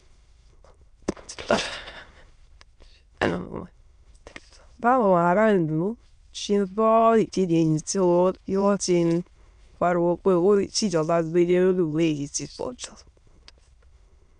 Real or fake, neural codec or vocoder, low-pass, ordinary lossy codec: fake; autoencoder, 22.05 kHz, a latent of 192 numbers a frame, VITS, trained on many speakers; 9.9 kHz; none